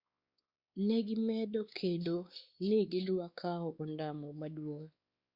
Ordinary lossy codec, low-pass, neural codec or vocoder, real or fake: Opus, 64 kbps; 5.4 kHz; codec, 16 kHz, 2 kbps, X-Codec, WavLM features, trained on Multilingual LibriSpeech; fake